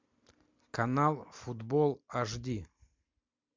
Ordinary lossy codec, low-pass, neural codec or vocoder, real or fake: MP3, 64 kbps; 7.2 kHz; none; real